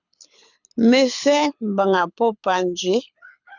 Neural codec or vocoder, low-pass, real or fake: codec, 24 kHz, 6 kbps, HILCodec; 7.2 kHz; fake